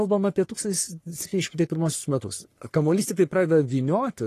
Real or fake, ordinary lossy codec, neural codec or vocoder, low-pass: fake; AAC, 48 kbps; codec, 44.1 kHz, 3.4 kbps, Pupu-Codec; 14.4 kHz